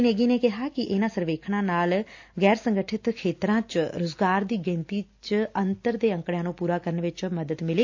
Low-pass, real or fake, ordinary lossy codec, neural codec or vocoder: 7.2 kHz; real; AAC, 48 kbps; none